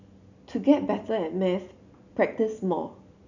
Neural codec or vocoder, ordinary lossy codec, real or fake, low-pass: none; none; real; 7.2 kHz